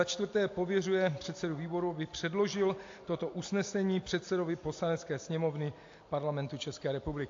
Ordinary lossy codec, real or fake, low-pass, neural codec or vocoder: AAC, 48 kbps; real; 7.2 kHz; none